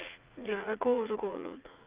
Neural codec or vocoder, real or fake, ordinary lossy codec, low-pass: vocoder, 44.1 kHz, 80 mel bands, Vocos; fake; Opus, 24 kbps; 3.6 kHz